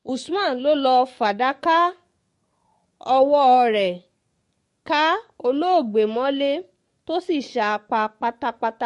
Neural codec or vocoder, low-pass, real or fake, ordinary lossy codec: codec, 44.1 kHz, 7.8 kbps, DAC; 14.4 kHz; fake; MP3, 48 kbps